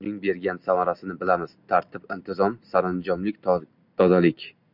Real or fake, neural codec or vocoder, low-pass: real; none; 5.4 kHz